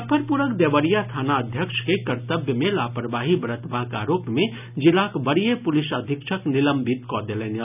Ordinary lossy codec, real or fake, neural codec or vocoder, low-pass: none; real; none; 3.6 kHz